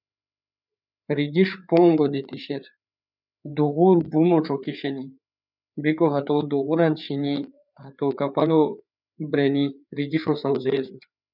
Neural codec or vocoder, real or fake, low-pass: codec, 16 kHz, 4 kbps, FreqCodec, larger model; fake; 5.4 kHz